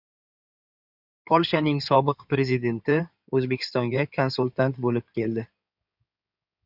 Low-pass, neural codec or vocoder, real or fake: 5.4 kHz; codec, 16 kHz in and 24 kHz out, 2.2 kbps, FireRedTTS-2 codec; fake